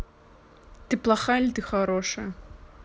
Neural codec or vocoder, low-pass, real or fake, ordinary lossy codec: none; none; real; none